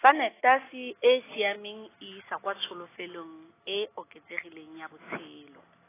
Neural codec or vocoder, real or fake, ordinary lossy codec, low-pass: none; real; AAC, 16 kbps; 3.6 kHz